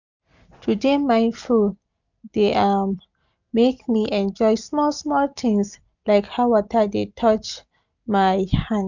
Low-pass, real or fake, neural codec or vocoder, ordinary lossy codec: 7.2 kHz; real; none; none